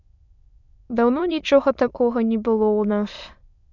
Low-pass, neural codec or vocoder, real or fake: 7.2 kHz; autoencoder, 22.05 kHz, a latent of 192 numbers a frame, VITS, trained on many speakers; fake